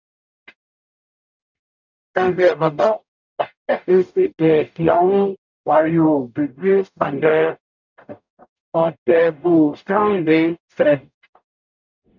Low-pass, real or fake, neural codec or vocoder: 7.2 kHz; fake; codec, 44.1 kHz, 0.9 kbps, DAC